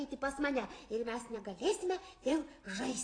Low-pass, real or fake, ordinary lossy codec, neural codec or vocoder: 9.9 kHz; fake; AAC, 32 kbps; vocoder, 44.1 kHz, 128 mel bands every 512 samples, BigVGAN v2